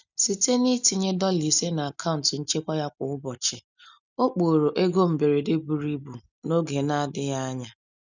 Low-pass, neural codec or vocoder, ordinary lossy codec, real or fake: 7.2 kHz; none; none; real